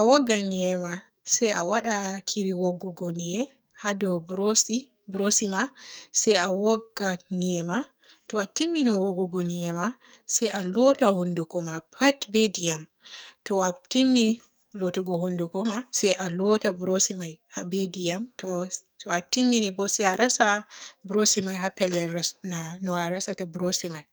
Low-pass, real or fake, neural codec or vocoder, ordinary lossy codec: none; fake; codec, 44.1 kHz, 2.6 kbps, SNAC; none